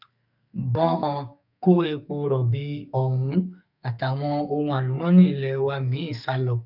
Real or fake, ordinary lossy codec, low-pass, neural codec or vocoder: fake; none; 5.4 kHz; codec, 32 kHz, 1.9 kbps, SNAC